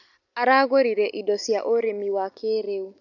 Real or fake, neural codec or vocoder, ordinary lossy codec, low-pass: real; none; none; 7.2 kHz